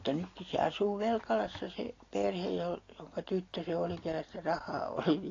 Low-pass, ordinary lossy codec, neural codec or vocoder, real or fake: 7.2 kHz; AAC, 32 kbps; none; real